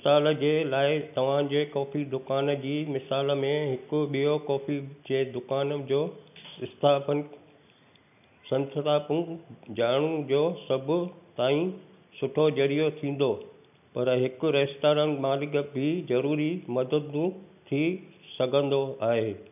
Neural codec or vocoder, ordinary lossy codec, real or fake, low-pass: none; none; real; 3.6 kHz